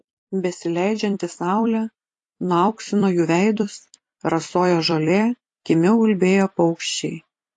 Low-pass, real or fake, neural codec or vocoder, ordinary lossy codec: 10.8 kHz; fake; vocoder, 44.1 kHz, 128 mel bands every 256 samples, BigVGAN v2; AAC, 48 kbps